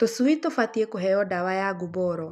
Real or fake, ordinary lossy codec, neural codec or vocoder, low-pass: real; none; none; 14.4 kHz